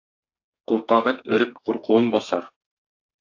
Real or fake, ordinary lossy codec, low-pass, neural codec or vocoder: fake; AAC, 48 kbps; 7.2 kHz; codec, 32 kHz, 1.9 kbps, SNAC